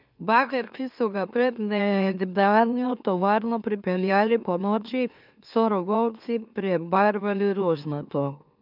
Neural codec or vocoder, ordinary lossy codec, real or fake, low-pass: autoencoder, 44.1 kHz, a latent of 192 numbers a frame, MeloTTS; none; fake; 5.4 kHz